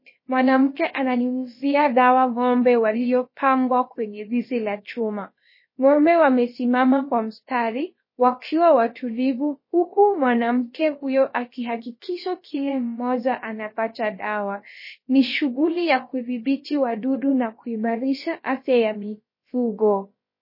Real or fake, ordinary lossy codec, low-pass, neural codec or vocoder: fake; MP3, 24 kbps; 5.4 kHz; codec, 16 kHz, about 1 kbps, DyCAST, with the encoder's durations